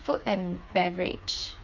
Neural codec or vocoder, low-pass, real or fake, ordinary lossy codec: codec, 16 kHz, 4 kbps, FreqCodec, smaller model; 7.2 kHz; fake; none